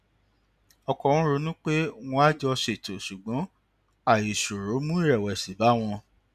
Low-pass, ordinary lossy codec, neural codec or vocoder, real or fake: 14.4 kHz; none; none; real